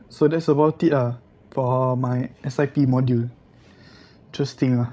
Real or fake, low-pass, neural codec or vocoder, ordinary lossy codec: fake; none; codec, 16 kHz, 16 kbps, FreqCodec, larger model; none